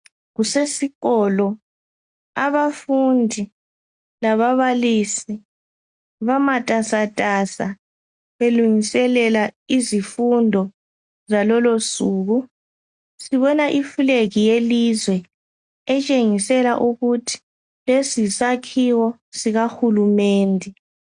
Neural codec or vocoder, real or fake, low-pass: none; real; 9.9 kHz